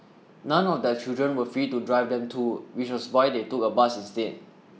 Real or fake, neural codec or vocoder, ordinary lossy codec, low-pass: real; none; none; none